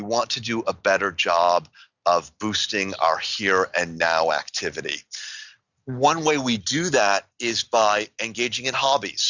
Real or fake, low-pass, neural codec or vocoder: real; 7.2 kHz; none